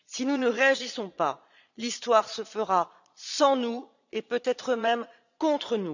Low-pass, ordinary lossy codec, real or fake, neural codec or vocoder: 7.2 kHz; none; fake; vocoder, 22.05 kHz, 80 mel bands, Vocos